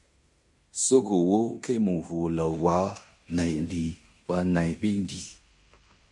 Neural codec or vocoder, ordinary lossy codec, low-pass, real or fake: codec, 16 kHz in and 24 kHz out, 0.9 kbps, LongCat-Audio-Codec, fine tuned four codebook decoder; MP3, 48 kbps; 10.8 kHz; fake